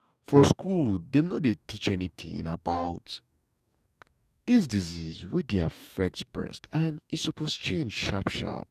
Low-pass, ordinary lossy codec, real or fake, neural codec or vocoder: 14.4 kHz; none; fake; codec, 44.1 kHz, 2.6 kbps, DAC